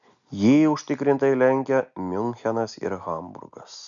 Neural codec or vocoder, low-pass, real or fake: none; 7.2 kHz; real